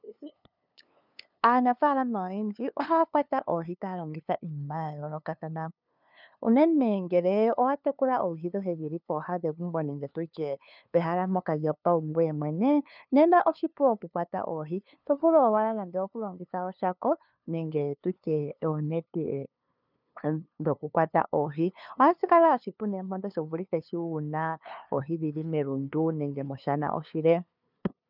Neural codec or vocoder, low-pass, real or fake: codec, 16 kHz, 2 kbps, FunCodec, trained on LibriTTS, 25 frames a second; 5.4 kHz; fake